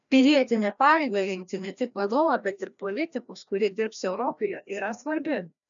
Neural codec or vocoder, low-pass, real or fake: codec, 16 kHz, 1 kbps, FreqCodec, larger model; 7.2 kHz; fake